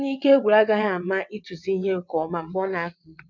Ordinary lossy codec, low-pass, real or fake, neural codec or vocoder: none; 7.2 kHz; fake; vocoder, 22.05 kHz, 80 mel bands, WaveNeXt